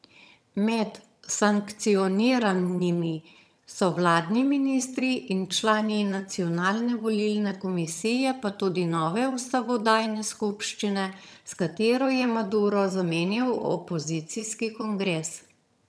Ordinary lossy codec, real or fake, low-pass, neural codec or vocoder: none; fake; none; vocoder, 22.05 kHz, 80 mel bands, HiFi-GAN